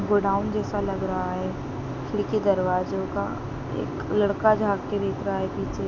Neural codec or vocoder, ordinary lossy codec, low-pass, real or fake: none; none; 7.2 kHz; real